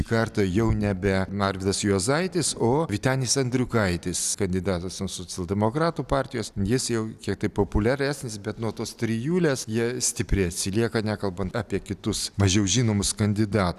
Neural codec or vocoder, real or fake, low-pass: none; real; 14.4 kHz